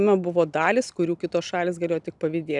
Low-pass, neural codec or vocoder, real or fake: 10.8 kHz; none; real